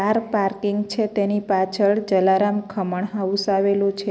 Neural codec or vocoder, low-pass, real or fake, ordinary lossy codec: none; none; real; none